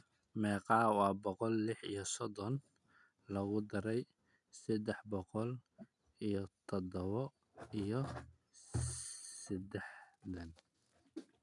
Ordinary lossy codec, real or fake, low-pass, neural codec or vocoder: none; real; 10.8 kHz; none